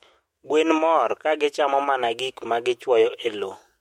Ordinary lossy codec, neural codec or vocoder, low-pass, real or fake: MP3, 64 kbps; autoencoder, 48 kHz, 128 numbers a frame, DAC-VAE, trained on Japanese speech; 19.8 kHz; fake